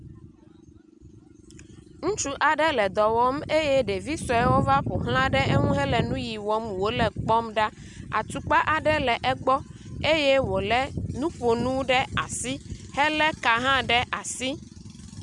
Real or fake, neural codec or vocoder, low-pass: real; none; 10.8 kHz